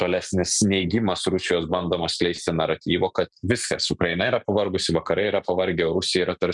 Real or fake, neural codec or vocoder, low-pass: real; none; 10.8 kHz